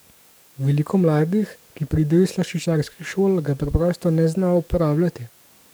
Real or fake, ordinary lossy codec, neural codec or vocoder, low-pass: fake; none; codec, 44.1 kHz, 7.8 kbps, DAC; none